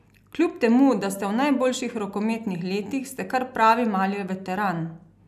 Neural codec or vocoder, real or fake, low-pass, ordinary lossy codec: none; real; 14.4 kHz; none